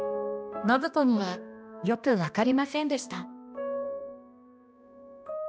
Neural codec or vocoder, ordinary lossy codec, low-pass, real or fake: codec, 16 kHz, 1 kbps, X-Codec, HuBERT features, trained on balanced general audio; none; none; fake